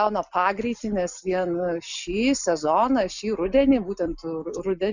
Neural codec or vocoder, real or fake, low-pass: none; real; 7.2 kHz